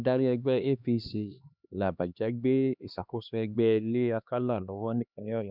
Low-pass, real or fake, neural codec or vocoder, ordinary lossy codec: 5.4 kHz; fake; codec, 16 kHz, 2 kbps, X-Codec, HuBERT features, trained on LibriSpeech; none